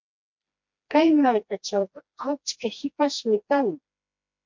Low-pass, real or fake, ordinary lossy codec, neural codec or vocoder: 7.2 kHz; fake; MP3, 48 kbps; codec, 16 kHz, 1 kbps, FreqCodec, smaller model